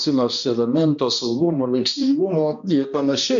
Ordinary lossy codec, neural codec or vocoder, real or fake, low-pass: MP3, 64 kbps; codec, 16 kHz, 1 kbps, X-Codec, HuBERT features, trained on balanced general audio; fake; 7.2 kHz